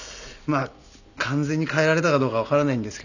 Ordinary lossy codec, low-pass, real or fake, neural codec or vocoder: none; 7.2 kHz; real; none